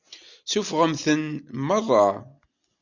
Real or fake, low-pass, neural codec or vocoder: real; 7.2 kHz; none